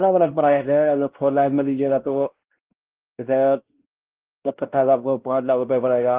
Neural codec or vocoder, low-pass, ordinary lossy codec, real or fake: codec, 16 kHz, 1 kbps, X-Codec, WavLM features, trained on Multilingual LibriSpeech; 3.6 kHz; Opus, 16 kbps; fake